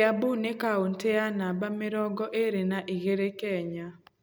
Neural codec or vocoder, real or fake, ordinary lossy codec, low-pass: none; real; none; none